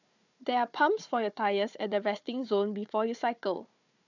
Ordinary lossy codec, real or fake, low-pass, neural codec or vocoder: none; fake; 7.2 kHz; codec, 16 kHz, 4 kbps, FunCodec, trained on Chinese and English, 50 frames a second